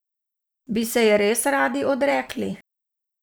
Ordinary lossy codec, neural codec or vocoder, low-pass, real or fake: none; vocoder, 44.1 kHz, 128 mel bands every 512 samples, BigVGAN v2; none; fake